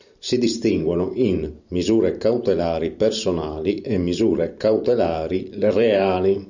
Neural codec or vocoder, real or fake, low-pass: vocoder, 44.1 kHz, 128 mel bands every 512 samples, BigVGAN v2; fake; 7.2 kHz